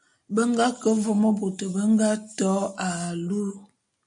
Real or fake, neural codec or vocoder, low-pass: real; none; 9.9 kHz